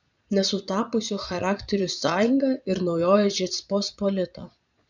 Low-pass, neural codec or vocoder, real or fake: 7.2 kHz; vocoder, 22.05 kHz, 80 mel bands, Vocos; fake